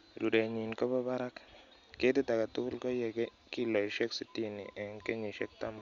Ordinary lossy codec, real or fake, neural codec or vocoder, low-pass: Opus, 64 kbps; real; none; 7.2 kHz